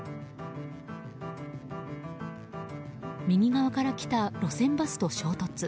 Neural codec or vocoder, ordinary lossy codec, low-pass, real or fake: none; none; none; real